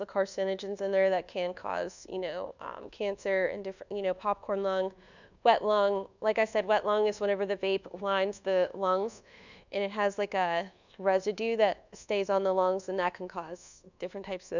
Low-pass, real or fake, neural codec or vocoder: 7.2 kHz; fake; codec, 24 kHz, 1.2 kbps, DualCodec